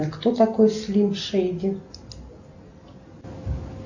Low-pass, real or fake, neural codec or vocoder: 7.2 kHz; real; none